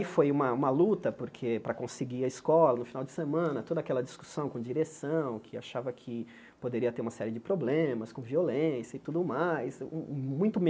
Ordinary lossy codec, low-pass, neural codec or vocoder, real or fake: none; none; none; real